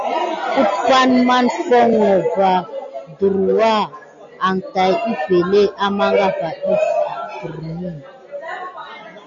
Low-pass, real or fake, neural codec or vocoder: 7.2 kHz; real; none